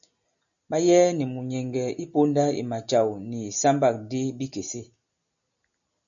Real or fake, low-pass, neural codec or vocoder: real; 7.2 kHz; none